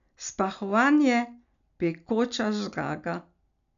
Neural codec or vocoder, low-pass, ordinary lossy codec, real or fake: none; 7.2 kHz; none; real